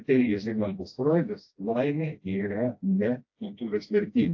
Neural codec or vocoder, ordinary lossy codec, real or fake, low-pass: codec, 16 kHz, 1 kbps, FreqCodec, smaller model; AAC, 48 kbps; fake; 7.2 kHz